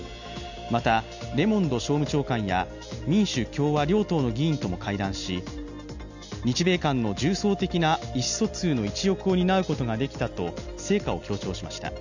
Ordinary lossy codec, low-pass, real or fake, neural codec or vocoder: none; 7.2 kHz; real; none